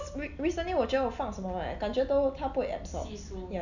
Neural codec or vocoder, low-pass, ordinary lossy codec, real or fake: none; 7.2 kHz; none; real